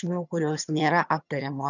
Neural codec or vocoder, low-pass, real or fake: vocoder, 22.05 kHz, 80 mel bands, HiFi-GAN; 7.2 kHz; fake